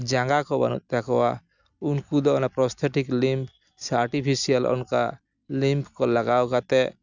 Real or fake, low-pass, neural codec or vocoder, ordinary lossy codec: real; 7.2 kHz; none; none